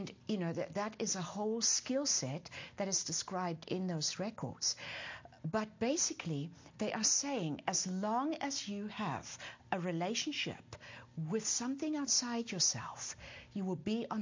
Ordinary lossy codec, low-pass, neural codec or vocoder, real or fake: MP3, 48 kbps; 7.2 kHz; none; real